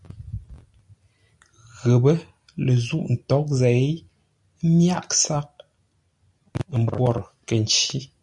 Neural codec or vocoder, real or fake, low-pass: none; real; 10.8 kHz